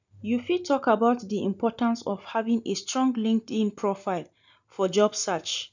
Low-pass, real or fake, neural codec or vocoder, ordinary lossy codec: 7.2 kHz; real; none; none